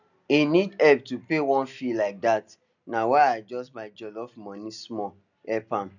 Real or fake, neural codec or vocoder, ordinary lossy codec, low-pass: real; none; none; 7.2 kHz